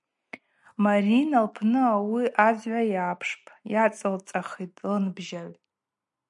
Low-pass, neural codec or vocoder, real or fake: 10.8 kHz; none; real